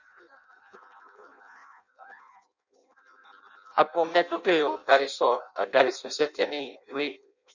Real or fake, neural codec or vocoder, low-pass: fake; codec, 16 kHz in and 24 kHz out, 0.6 kbps, FireRedTTS-2 codec; 7.2 kHz